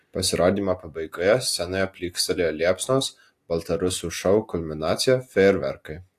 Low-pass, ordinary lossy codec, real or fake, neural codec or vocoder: 14.4 kHz; AAC, 64 kbps; real; none